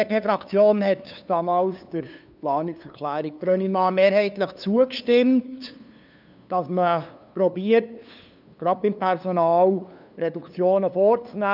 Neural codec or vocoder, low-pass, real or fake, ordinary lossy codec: codec, 16 kHz, 2 kbps, FunCodec, trained on LibriTTS, 25 frames a second; 5.4 kHz; fake; none